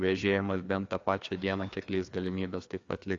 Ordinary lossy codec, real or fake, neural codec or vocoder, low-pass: AAC, 48 kbps; fake; codec, 16 kHz, 2 kbps, FreqCodec, larger model; 7.2 kHz